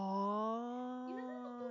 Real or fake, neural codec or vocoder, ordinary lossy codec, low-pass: real; none; none; 7.2 kHz